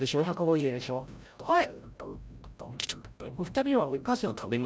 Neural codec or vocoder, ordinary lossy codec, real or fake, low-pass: codec, 16 kHz, 0.5 kbps, FreqCodec, larger model; none; fake; none